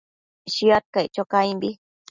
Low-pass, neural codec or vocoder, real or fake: 7.2 kHz; none; real